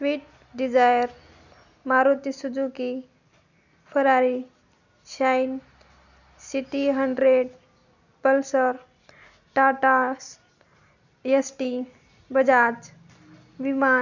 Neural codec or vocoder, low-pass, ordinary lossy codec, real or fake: none; 7.2 kHz; none; real